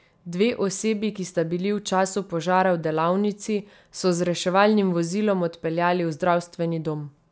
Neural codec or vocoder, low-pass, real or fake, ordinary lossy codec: none; none; real; none